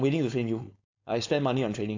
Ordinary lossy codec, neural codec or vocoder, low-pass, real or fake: AAC, 48 kbps; codec, 16 kHz, 4.8 kbps, FACodec; 7.2 kHz; fake